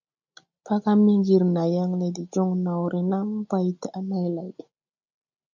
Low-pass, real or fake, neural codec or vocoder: 7.2 kHz; real; none